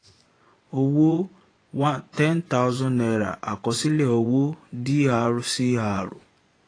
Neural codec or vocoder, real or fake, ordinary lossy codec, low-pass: vocoder, 24 kHz, 100 mel bands, Vocos; fake; AAC, 32 kbps; 9.9 kHz